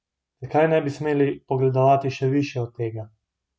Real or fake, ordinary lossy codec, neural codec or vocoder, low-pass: real; none; none; none